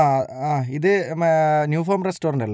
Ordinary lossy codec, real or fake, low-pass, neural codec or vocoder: none; real; none; none